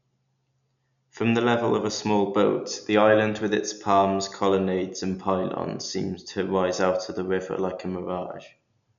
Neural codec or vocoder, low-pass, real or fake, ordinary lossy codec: none; 7.2 kHz; real; none